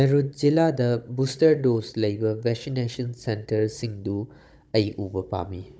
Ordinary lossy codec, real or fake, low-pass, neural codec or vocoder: none; fake; none; codec, 16 kHz, 16 kbps, FunCodec, trained on Chinese and English, 50 frames a second